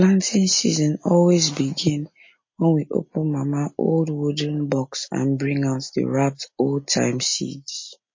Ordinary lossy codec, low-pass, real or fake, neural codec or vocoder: MP3, 32 kbps; 7.2 kHz; real; none